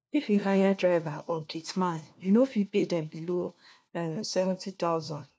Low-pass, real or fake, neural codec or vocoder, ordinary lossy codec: none; fake; codec, 16 kHz, 1 kbps, FunCodec, trained on LibriTTS, 50 frames a second; none